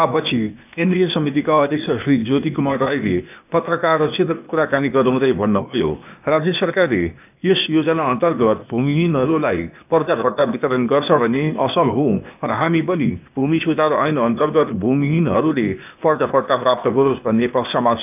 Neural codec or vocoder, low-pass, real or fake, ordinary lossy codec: codec, 16 kHz, 0.8 kbps, ZipCodec; 3.6 kHz; fake; none